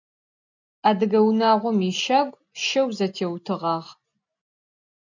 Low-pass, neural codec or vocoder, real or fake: 7.2 kHz; none; real